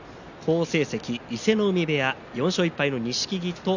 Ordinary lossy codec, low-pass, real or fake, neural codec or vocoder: none; 7.2 kHz; real; none